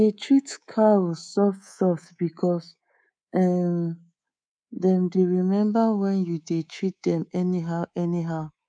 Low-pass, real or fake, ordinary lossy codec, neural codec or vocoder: 9.9 kHz; fake; none; codec, 24 kHz, 3.1 kbps, DualCodec